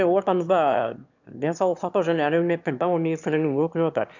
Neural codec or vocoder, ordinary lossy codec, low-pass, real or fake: autoencoder, 22.05 kHz, a latent of 192 numbers a frame, VITS, trained on one speaker; none; 7.2 kHz; fake